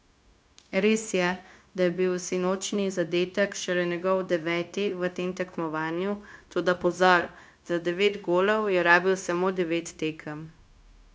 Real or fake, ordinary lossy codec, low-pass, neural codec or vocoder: fake; none; none; codec, 16 kHz, 0.9 kbps, LongCat-Audio-Codec